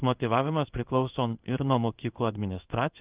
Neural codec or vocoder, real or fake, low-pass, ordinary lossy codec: codec, 16 kHz in and 24 kHz out, 1 kbps, XY-Tokenizer; fake; 3.6 kHz; Opus, 32 kbps